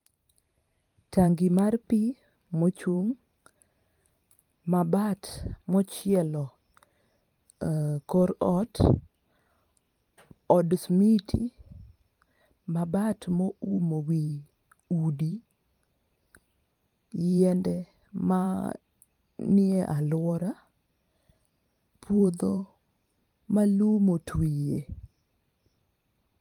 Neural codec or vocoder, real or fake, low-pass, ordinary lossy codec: vocoder, 44.1 kHz, 128 mel bands every 512 samples, BigVGAN v2; fake; 19.8 kHz; Opus, 32 kbps